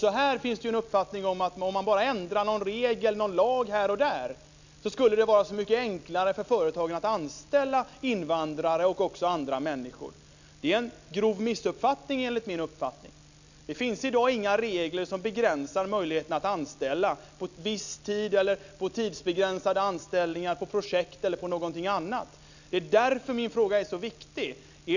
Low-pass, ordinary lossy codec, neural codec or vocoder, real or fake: 7.2 kHz; none; none; real